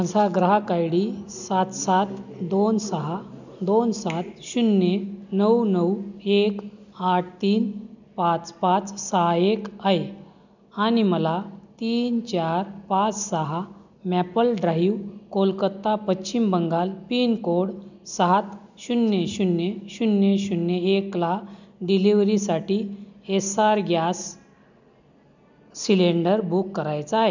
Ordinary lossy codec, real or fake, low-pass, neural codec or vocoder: none; real; 7.2 kHz; none